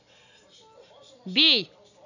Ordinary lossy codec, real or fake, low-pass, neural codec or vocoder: none; real; 7.2 kHz; none